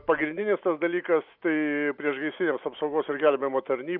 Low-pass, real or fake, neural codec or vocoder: 5.4 kHz; fake; vocoder, 44.1 kHz, 128 mel bands every 512 samples, BigVGAN v2